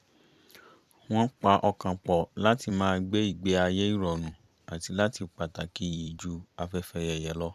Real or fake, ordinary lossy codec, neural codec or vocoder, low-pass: fake; none; vocoder, 44.1 kHz, 128 mel bands every 256 samples, BigVGAN v2; 14.4 kHz